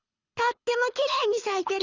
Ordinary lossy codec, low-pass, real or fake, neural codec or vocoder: Opus, 64 kbps; 7.2 kHz; fake; codec, 24 kHz, 6 kbps, HILCodec